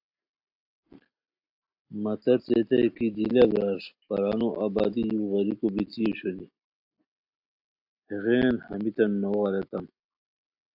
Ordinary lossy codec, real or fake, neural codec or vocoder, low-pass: AAC, 48 kbps; real; none; 5.4 kHz